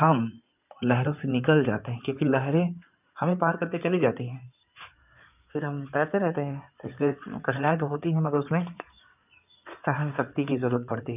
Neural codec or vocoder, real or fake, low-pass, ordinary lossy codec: codec, 16 kHz in and 24 kHz out, 2.2 kbps, FireRedTTS-2 codec; fake; 3.6 kHz; none